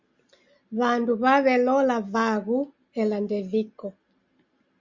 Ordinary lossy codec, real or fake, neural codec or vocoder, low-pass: Opus, 64 kbps; real; none; 7.2 kHz